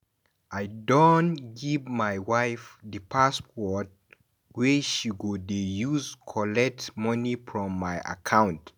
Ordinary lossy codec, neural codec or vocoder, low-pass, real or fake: none; none; none; real